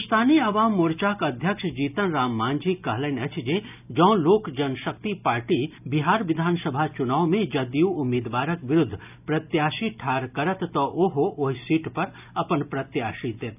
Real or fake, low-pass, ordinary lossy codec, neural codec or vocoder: real; 3.6 kHz; none; none